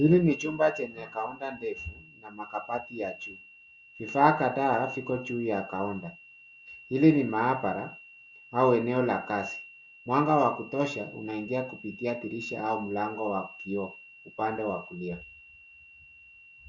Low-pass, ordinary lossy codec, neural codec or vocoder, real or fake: 7.2 kHz; Opus, 64 kbps; none; real